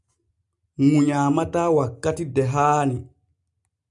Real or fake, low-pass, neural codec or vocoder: real; 10.8 kHz; none